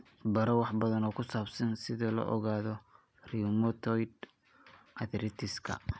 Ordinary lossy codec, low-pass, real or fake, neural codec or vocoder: none; none; real; none